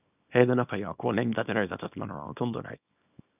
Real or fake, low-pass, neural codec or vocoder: fake; 3.6 kHz; codec, 24 kHz, 0.9 kbps, WavTokenizer, small release